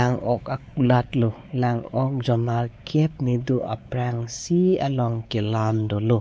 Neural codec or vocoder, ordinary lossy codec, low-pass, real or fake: codec, 16 kHz, 4 kbps, X-Codec, WavLM features, trained on Multilingual LibriSpeech; none; none; fake